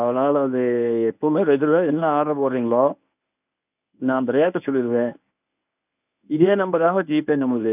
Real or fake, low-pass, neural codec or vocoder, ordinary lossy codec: fake; 3.6 kHz; codec, 24 kHz, 0.9 kbps, WavTokenizer, medium speech release version 1; none